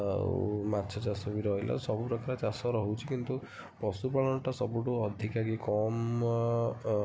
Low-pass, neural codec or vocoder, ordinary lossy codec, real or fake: none; none; none; real